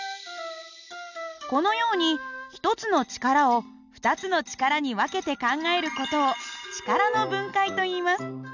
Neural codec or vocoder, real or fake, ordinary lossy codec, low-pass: none; real; none; 7.2 kHz